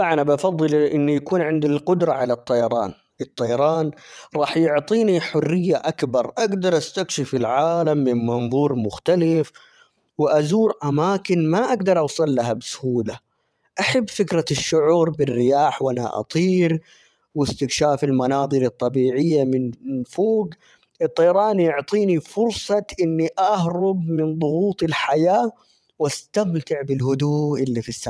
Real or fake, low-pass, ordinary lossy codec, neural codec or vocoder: fake; none; none; vocoder, 22.05 kHz, 80 mel bands, WaveNeXt